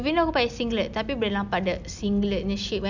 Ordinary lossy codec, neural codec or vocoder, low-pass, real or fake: none; none; 7.2 kHz; real